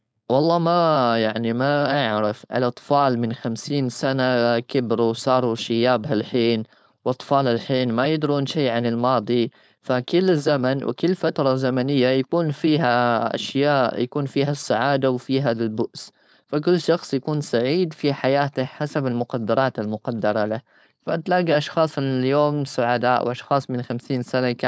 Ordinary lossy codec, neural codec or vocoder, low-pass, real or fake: none; codec, 16 kHz, 4.8 kbps, FACodec; none; fake